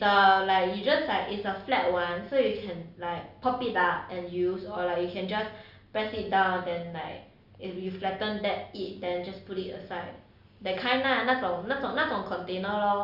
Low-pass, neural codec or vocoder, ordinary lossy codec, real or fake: 5.4 kHz; none; Opus, 64 kbps; real